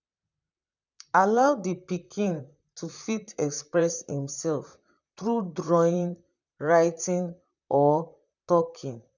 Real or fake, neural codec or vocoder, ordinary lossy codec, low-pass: fake; vocoder, 44.1 kHz, 128 mel bands, Pupu-Vocoder; none; 7.2 kHz